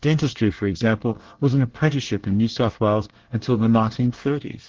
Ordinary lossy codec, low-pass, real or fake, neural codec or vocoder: Opus, 16 kbps; 7.2 kHz; fake; codec, 24 kHz, 1 kbps, SNAC